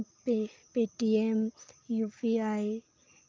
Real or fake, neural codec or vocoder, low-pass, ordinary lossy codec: real; none; 7.2 kHz; Opus, 32 kbps